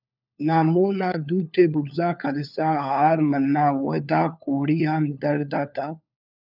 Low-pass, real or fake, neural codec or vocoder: 5.4 kHz; fake; codec, 16 kHz, 4 kbps, FunCodec, trained on LibriTTS, 50 frames a second